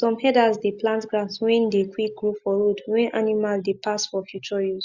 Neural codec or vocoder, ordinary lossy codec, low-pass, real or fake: none; Opus, 64 kbps; 7.2 kHz; real